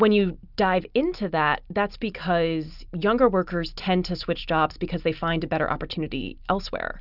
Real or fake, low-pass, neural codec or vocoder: real; 5.4 kHz; none